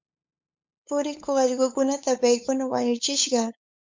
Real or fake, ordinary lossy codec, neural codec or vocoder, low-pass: fake; MP3, 64 kbps; codec, 16 kHz, 8 kbps, FunCodec, trained on LibriTTS, 25 frames a second; 7.2 kHz